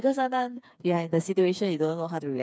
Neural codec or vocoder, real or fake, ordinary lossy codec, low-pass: codec, 16 kHz, 4 kbps, FreqCodec, smaller model; fake; none; none